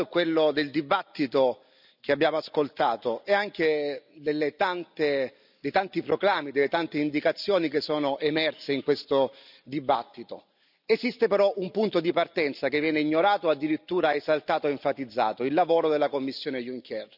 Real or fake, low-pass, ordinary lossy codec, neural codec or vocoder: real; 5.4 kHz; none; none